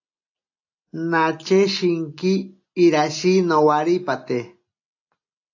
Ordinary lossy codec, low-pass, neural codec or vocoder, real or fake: AAC, 48 kbps; 7.2 kHz; none; real